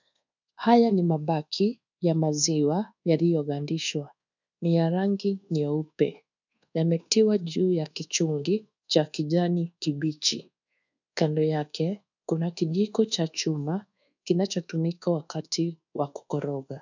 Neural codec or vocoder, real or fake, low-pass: codec, 24 kHz, 1.2 kbps, DualCodec; fake; 7.2 kHz